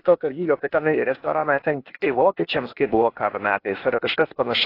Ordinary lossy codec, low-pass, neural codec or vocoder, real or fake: AAC, 32 kbps; 5.4 kHz; codec, 16 kHz, 0.8 kbps, ZipCodec; fake